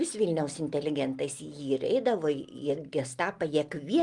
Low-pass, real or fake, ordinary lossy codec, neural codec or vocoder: 10.8 kHz; real; Opus, 24 kbps; none